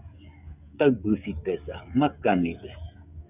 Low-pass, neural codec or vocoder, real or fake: 3.6 kHz; codec, 16 kHz, 16 kbps, FreqCodec, smaller model; fake